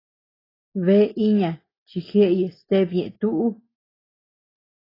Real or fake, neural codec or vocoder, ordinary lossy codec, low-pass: real; none; AAC, 24 kbps; 5.4 kHz